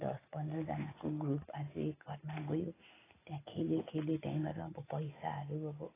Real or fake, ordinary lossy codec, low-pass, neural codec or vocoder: real; AAC, 16 kbps; 3.6 kHz; none